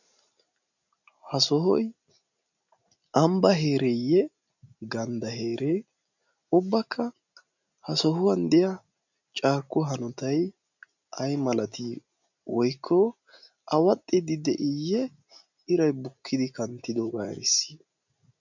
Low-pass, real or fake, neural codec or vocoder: 7.2 kHz; real; none